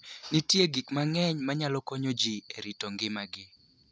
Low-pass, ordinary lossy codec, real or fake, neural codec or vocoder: none; none; real; none